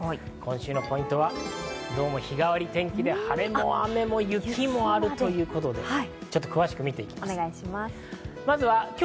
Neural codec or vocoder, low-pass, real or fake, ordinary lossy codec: none; none; real; none